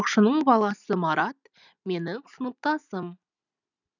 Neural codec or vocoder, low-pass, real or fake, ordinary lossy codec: none; 7.2 kHz; real; none